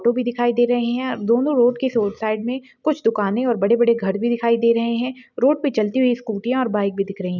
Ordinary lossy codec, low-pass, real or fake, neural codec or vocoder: none; 7.2 kHz; real; none